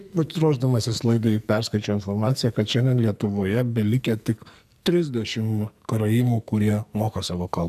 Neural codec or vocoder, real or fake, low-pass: codec, 44.1 kHz, 2.6 kbps, SNAC; fake; 14.4 kHz